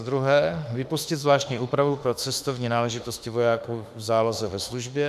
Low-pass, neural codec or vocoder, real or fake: 14.4 kHz; autoencoder, 48 kHz, 32 numbers a frame, DAC-VAE, trained on Japanese speech; fake